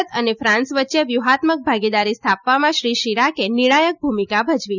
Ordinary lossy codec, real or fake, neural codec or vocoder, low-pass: none; real; none; 7.2 kHz